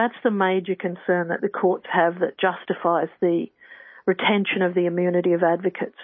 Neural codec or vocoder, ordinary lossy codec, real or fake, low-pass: none; MP3, 24 kbps; real; 7.2 kHz